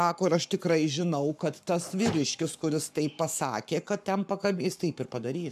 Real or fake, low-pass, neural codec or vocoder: fake; 14.4 kHz; codec, 44.1 kHz, 7.8 kbps, Pupu-Codec